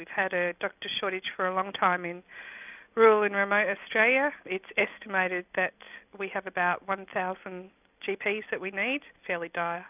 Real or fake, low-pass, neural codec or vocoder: real; 3.6 kHz; none